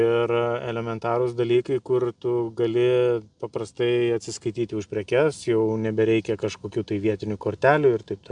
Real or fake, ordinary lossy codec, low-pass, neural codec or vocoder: real; MP3, 96 kbps; 9.9 kHz; none